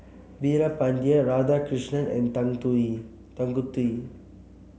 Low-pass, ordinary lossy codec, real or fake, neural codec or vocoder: none; none; real; none